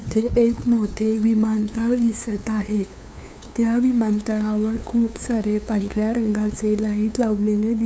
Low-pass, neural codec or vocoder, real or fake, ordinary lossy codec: none; codec, 16 kHz, 2 kbps, FunCodec, trained on LibriTTS, 25 frames a second; fake; none